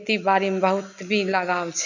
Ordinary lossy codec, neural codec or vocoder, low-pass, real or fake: none; none; 7.2 kHz; real